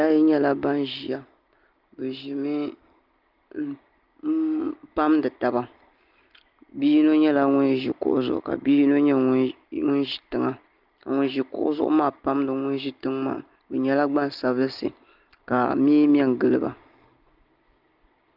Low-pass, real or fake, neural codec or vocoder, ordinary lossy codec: 5.4 kHz; real; none; Opus, 32 kbps